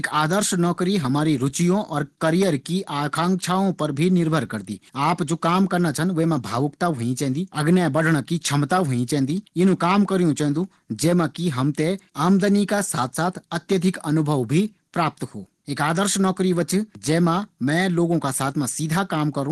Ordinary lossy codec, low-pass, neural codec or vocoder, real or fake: Opus, 16 kbps; 10.8 kHz; none; real